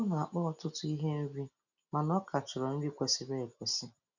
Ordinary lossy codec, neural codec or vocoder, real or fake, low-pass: none; none; real; 7.2 kHz